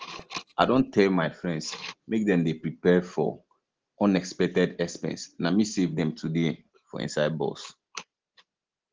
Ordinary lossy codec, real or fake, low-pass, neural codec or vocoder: Opus, 16 kbps; real; 7.2 kHz; none